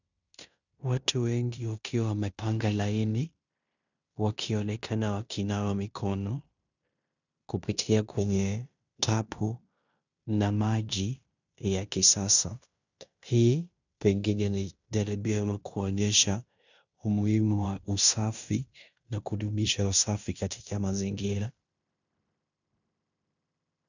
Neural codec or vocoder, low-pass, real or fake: codec, 16 kHz in and 24 kHz out, 0.9 kbps, LongCat-Audio-Codec, fine tuned four codebook decoder; 7.2 kHz; fake